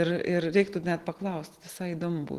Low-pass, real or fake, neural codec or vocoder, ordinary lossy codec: 14.4 kHz; real; none; Opus, 16 kbps